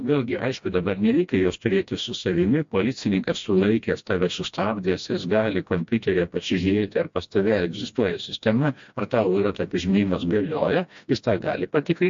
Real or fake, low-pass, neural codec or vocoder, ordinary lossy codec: fake; 7.2 kHz; codec, 16 kHz, 1 kbps, FreqCodec, smaller model; MP3, 48 kbps